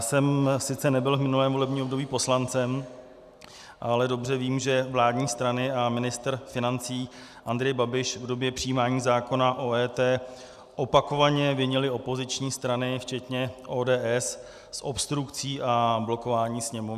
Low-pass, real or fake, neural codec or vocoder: 14.4 kHz; real; none